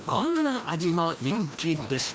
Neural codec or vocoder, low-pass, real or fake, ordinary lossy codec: codec, 16 kHz, 1 kbps, FreqCodec, larger model; none; fake; none